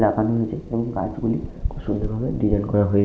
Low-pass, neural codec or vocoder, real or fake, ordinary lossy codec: none; none; real; none